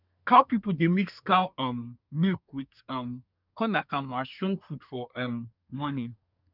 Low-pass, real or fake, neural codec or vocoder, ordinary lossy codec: 5.4 kHz; fake; codec, 24 kHz, 1 kbps, SNAC; none